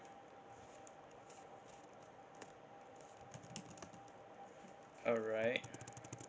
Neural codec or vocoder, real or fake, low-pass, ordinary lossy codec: none; real; none; none